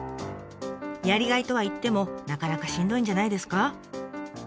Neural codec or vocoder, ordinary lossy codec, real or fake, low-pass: none; none; real; none